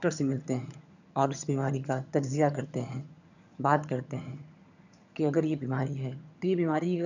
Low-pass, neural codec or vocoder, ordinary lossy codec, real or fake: 7.2 kHz; vocoder, 22.05 kHz, 80 mel bands, HiFi-GAN; none; fake